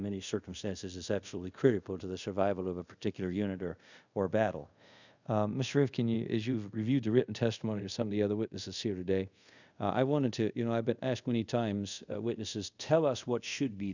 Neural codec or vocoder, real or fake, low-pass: codec, 24 kHz, 0.5 kbps, DualCodec; fake; 7.2 kHz